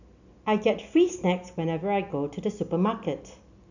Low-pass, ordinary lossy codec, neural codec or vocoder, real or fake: 7.2 kHz; none; none; real